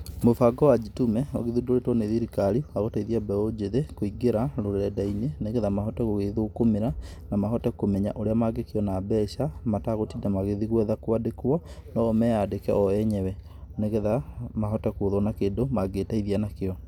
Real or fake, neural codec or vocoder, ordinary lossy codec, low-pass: real; none; none; 19.8 kHz